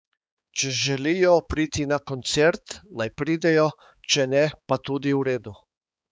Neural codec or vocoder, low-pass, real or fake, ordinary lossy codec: codec, 16 kHz, 4 kbps, X-Codec, HuBERT features, trained on balanced general audio; none; fake; none